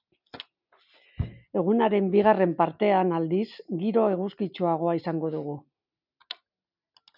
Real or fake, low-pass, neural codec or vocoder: real; 5.4 kHz; none